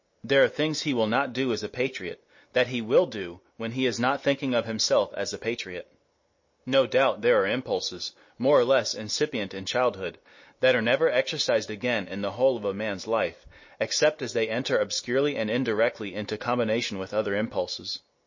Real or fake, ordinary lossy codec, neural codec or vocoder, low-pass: real; MP3, 32 kbps; none; 7.2 kHz